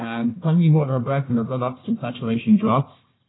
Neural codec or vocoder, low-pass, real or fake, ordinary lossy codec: codec, 16 kHz, 1 kbps, FunCodec, trained on Chinese and English, 50 frames a second; 7.2 kHz; fake; AAC, 16 kbps